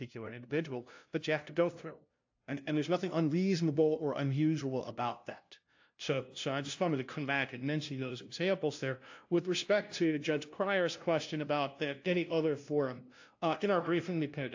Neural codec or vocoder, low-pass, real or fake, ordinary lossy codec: codec, 16 kHz, 0.5 kbps, FunCodec, trained on LibriTTS, 25 frames a second; 7.2 kHz; fake; AAC, 48 kbps